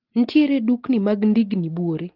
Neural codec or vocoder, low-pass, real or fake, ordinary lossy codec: none; 5.4 kHz; real; Opus, 16 kbps